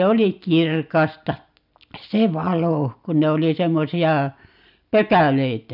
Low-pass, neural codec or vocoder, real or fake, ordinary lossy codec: 5.4 kHz; none; real; none